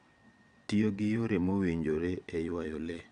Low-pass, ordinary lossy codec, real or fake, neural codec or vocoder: 9.9 kHz; none; fake; vocoder, 22.05 kHz, 80 mel bands, WaveNeXt